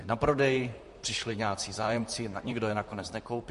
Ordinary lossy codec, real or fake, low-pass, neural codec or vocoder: MP3, 48 kbps; fake; 14.4 kHz; vocoder, 44.1 kHz, 128 mel bands, Pupu-Vocoder